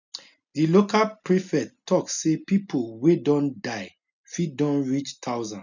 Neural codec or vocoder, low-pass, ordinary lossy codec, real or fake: none; 7.2 kHz; none; real